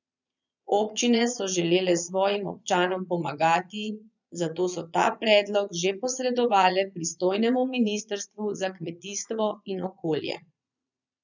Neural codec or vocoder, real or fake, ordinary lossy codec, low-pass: vocoder, 22.05 kHz, 80 mel bands, Vocos; fake; none; 7.2 kHz